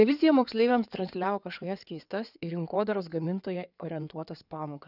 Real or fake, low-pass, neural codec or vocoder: fake; 5.4 kHz; codec, 16 kHz in and 24 kHz out, 2.2 kbps, FireRedTTS-2 codec